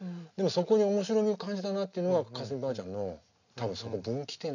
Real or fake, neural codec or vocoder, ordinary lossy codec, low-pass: real; none; none; 7.2 kHz